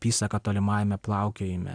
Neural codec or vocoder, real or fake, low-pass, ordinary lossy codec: none; real; 9.9 kHz; AAC, 64 kbps